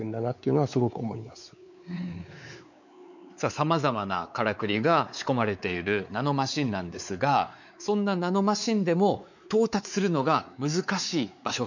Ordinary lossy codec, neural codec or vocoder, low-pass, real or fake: none; codec, 16 kHz, 4 kbps, X-Codec, WavLM features, trained on Multilingual LibriSpeech; 7.2 kHz; fake